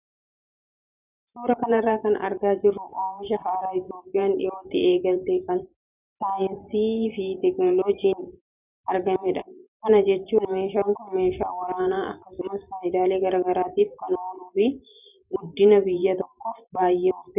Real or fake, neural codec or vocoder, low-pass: fake; vocoder, 44.1 kHz, 128 mel bands every 512 samples, BigVGAN v2; 3.6 kHz